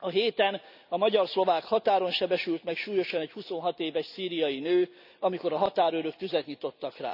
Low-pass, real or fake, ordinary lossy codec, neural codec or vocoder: 5.4 kHz; real; none; none